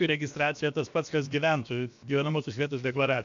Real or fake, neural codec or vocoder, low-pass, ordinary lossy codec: fake; codec, 16 kHz, about 1 kbps, DyCAST, with the encoder's durations; 7.2 kHz; MP3, 64 kbps